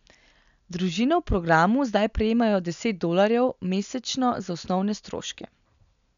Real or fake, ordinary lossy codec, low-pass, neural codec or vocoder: real; none; 7.2 kHz; none